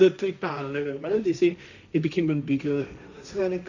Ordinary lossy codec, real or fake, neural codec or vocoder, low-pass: none; fake; codec, 16 kHz, 1.1 kbps, Voila-Tokenizer; 7.2 kHz